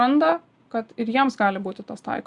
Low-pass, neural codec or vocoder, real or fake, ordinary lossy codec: 10.8 kHz; none; real; Opus, 64 kbps